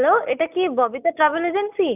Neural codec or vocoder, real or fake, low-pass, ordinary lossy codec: none; real; 3.6 kHz; none